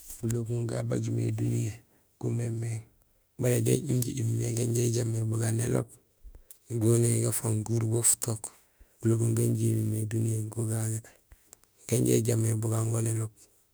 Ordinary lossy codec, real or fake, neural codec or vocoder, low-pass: none; fake; autoencoder, 48 kHz, 32 numbers a frame, DAC-VAE, trained on Japanese speech; none